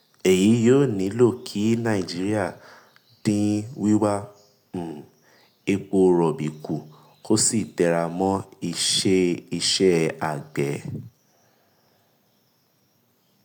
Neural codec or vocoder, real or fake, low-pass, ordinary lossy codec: none; real; none; none